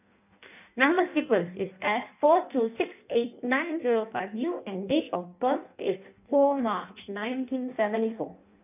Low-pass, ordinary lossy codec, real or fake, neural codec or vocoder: 3.6 kHz; none; fake; codec, 16 kHz in and 24 kHz out, 0.6 kbps, FireRedTTS-2 codec